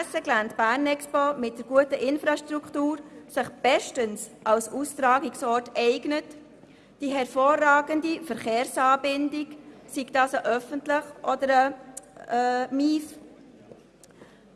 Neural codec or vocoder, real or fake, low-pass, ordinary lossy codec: none; real; none; none